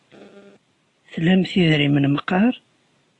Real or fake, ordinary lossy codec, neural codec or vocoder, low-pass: real; AAC, 48 kbps; none; 10.8 kHz